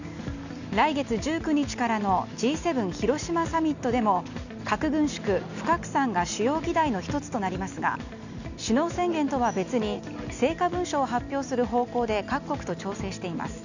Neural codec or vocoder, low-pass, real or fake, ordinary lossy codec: none; 7.2 kHz; real; none